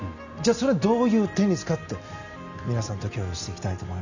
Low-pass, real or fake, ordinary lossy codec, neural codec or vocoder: 7.2 kHz; real; none; none